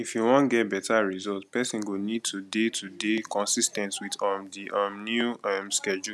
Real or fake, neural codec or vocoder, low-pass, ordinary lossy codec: real; none; none; none